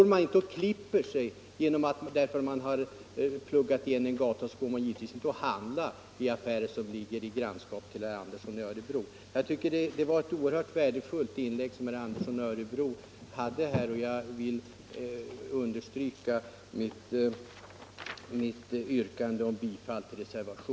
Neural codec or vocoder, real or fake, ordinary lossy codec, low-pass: none; real; none; none